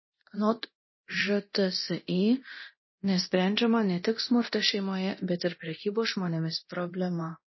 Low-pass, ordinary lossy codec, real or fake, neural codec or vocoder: 7.2 kHz; MP3, 24 kbps; fake; codec, 24 kHz, 0.9 kbps, DualCodec